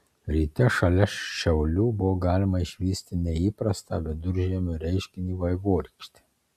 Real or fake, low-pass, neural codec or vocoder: real; 14.4 kHz; none